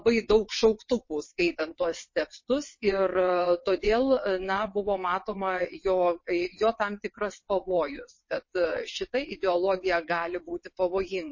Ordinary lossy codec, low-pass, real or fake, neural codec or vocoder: MP3, 32 kbps; 7.2 kHz; fake; vocoder, 22.05 kHz, 80 mel bands, WaveNeXt